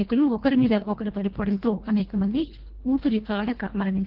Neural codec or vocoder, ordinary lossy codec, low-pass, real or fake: codec, 24 kHz, 1.5 kbps, HILCodec; Opus, 16 kbps; 5.4 kHz; fake